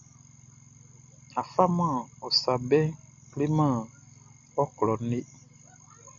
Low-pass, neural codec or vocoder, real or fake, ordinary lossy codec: 7.2 kHz; none; real; MP3, 64 kbps